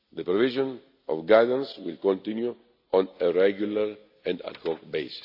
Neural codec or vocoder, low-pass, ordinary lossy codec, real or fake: none; 5.4 kHz; AAC, 48 kbps; real